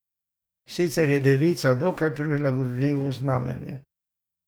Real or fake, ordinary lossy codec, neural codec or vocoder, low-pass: fake; none; codec, 44.1 kHz, 2.6 kbps, DAC; none